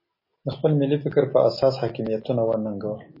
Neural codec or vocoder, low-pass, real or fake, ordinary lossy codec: none; 5.4 kHz; real; MP3, 24 kbps